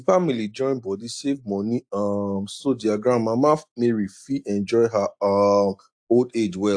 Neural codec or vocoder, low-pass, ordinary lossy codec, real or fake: none; 9.9 kHz; AAC, 64 kbps; real